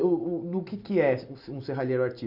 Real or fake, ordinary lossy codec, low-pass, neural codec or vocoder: real; none; 5.4 kHz; none